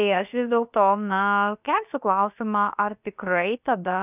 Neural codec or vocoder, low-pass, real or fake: codec, 16 kHz, about 1 kbps, DyCAST, with the encoder's durations; 3.6 kHz; fake